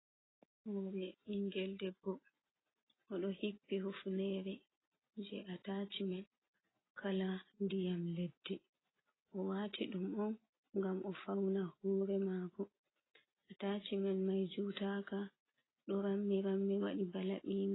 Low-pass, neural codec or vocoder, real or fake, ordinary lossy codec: 7.2 kHz; none; real; AAC, 16 kbps